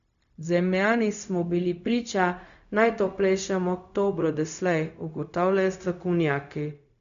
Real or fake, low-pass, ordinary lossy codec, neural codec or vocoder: fake; 7.2 kHz; none; codec, 16 kHz, 0.4 kbps, LongCat-Audio-Codec